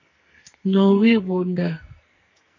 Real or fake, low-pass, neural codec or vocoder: fake; 7.2 kHz; codec, 44.1 kHz, 2.6 kbps, SNAC